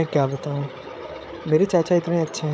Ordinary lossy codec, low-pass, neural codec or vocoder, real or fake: none; none; codec, 16 kHz, 8 kbps, FreqCodec, larger model; fake